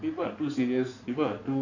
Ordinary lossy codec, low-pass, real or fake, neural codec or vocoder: none; 7.2 kHz; fake; codec, 16 kHz in and 24 kHz out, 2.2 kbps, FireRedTTS-2 codec